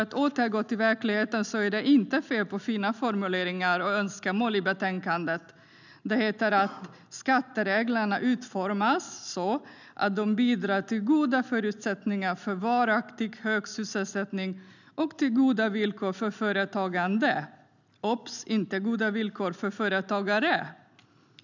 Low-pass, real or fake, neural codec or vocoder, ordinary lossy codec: 7.2 kHz; real; none; none